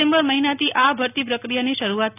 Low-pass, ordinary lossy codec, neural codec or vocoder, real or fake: 3.6 kHz; none; none; real